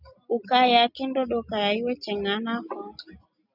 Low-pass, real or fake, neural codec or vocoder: 5.4 kHz; real; none